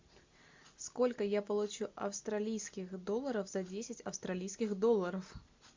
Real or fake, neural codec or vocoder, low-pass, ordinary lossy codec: real; none; 7.2 kHz; MP3, 64 kbps